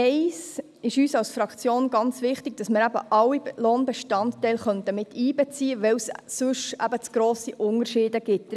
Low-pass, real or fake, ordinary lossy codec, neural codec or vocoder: none; real; none; none